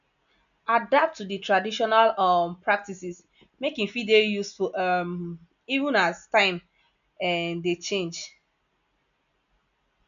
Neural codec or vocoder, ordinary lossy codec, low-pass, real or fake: none; none; 7.2 kHz; real